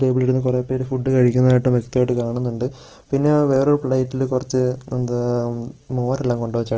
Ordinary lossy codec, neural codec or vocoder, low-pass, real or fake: Opus, 16 kbps; none; 7.2 kHz; real